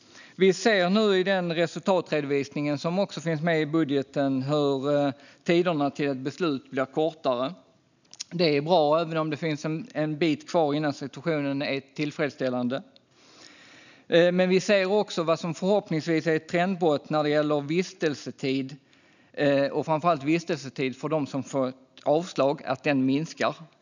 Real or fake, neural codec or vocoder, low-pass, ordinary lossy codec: real; none; 7.2 kHz; none